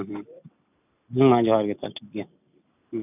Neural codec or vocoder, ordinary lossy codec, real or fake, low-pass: none; none; real; 3.6 kHz